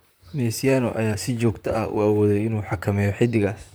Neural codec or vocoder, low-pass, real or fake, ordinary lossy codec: vocoder, 44.1 kHz, 128 mel bands, Pupu-Vocoder; none; fake; none